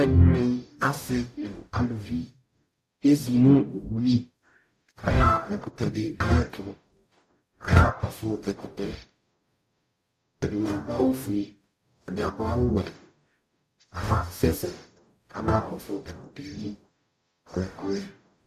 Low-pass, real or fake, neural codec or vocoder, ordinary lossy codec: 14.4 kHz; fake; codec, 44.1 kHz, 0.9 kbps, DAC; AAC, 96 kbps